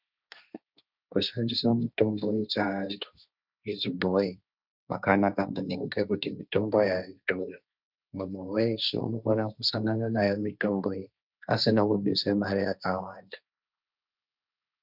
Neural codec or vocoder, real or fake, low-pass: codec, 16 kHz, 1.1 kbps, Voila-Tokenizer; fake; 5.4 kHz